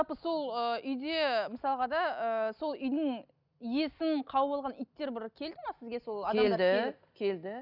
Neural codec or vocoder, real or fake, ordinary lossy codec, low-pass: none; real; none; 5.4 kHz